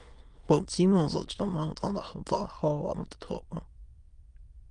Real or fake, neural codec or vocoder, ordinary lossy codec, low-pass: fake; autoencoder, 22.05 kHz, a latent of 192 numbers a frame, VITS, trained on many speakers; Opus, 32 kbps; 9.9 kHz